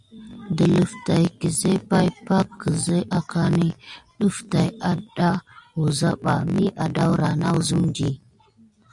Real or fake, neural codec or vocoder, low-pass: real; none; 10.8 kHz